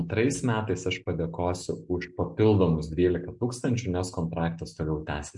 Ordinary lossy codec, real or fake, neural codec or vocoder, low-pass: MP3, 64 kbps; real; none; 10.8 kHz